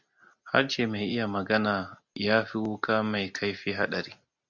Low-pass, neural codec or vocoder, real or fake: 7.2 kHz; none; real